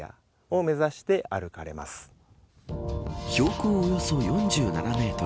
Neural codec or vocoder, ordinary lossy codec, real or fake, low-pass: none; none; real; none